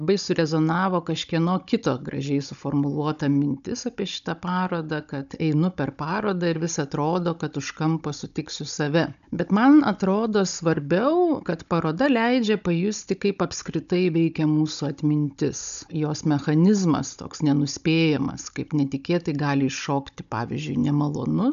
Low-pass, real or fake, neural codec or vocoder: 7.2 kHz; fake; codec, 16 kHz, 16 kbps, FunCodec, trained on Chinese and English, 50 frames a second